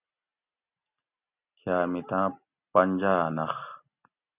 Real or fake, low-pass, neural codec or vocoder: real; 3.6 kHz; none